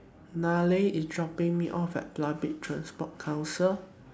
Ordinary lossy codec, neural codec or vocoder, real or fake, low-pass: none; none; real; none